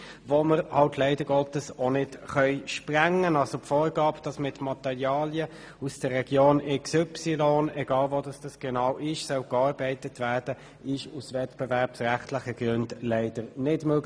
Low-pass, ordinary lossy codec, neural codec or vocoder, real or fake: none; none; none; real